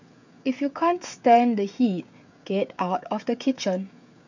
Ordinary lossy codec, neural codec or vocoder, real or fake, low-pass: none; codec, 16 kHz, 16 kbps, FreqCodec, smaller model; fake; 7.2 kHz